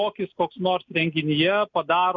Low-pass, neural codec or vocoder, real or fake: 7.2 kHz; none; real